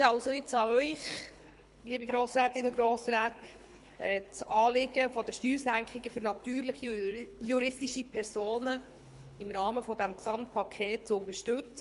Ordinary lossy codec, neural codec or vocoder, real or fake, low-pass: MP3, 64 kbps; codec, 24 kHz, 3 kbps, HILCodec; fake; 10.8 kHz